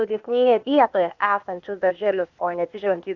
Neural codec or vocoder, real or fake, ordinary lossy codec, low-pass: codec, 16 kHz, 0.8 kbps, ZipCodec; fake; MP3, 64 kbps; 7.2 kHz